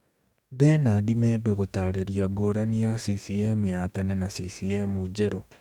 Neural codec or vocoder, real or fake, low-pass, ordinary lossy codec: codec, 44.1 kHz, 2.6 kbps, DAC; fake; 19.8 kHz; none